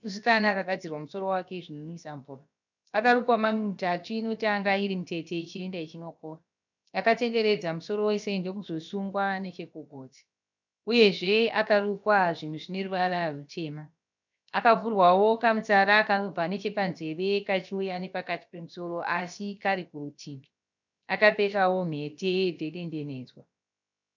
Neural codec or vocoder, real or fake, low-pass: codec, 16 kHz, about 1 kbps, DyCAST, with the encoder's durations; fake; 7.2 kHz